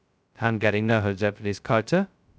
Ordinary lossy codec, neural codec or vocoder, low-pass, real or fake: none; codec, 16 kHz, 0.2 kbps, FocalCodec; none; fake